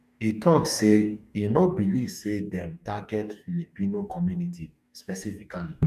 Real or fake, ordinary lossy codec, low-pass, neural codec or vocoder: fake; none; 14.4 kHz; codec, 44.1 kHz, 2.6 kbps, DAC